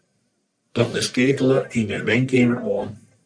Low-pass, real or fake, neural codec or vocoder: 9.9 kHz; fake; codec, 44.1 kHz, 1.7 kbps, Pupu-Codec